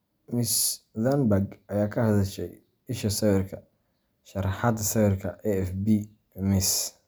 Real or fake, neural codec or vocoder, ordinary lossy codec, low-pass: real; none; none; none